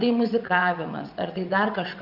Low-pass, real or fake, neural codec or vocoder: 5.4 kHz; fake; vocoder, 22.05 kHz, 80 mel bands, WaveNeXt